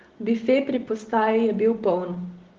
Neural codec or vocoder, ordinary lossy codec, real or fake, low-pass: none; Opus, 16 kbps; real; 7.2 kHz